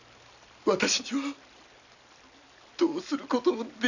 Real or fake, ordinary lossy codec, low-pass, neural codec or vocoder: real; none; 7.2 kHz; none